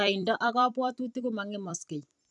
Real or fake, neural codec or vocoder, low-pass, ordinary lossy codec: fake; vocoder, 44.1 kHz, 128 mel bands every 256 samples, BigVGAN v2; 10.8 kHz; none